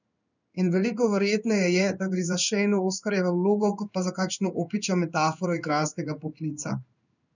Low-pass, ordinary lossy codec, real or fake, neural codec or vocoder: 7.2 kHz; none; fake; codec, 16 kHz in and 24 kHz out, 1 kbps, XY-Tokenizer